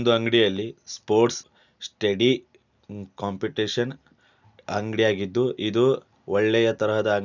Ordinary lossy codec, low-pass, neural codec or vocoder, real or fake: none; 7.2 kHz; none; real